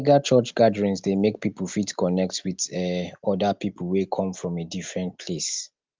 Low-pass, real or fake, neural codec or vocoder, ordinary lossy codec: 7.2 kHz; real; none; Opus, 32 kbps